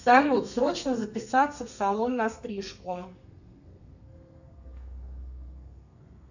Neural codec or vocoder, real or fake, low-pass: codec, 32 kHz, 1.9 kbps, SNAC; fake; 7.2 kHz